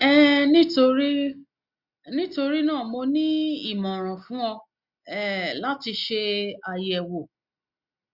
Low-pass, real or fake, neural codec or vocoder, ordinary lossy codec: 5.4 kHz; real; none; Opus, 64 kbps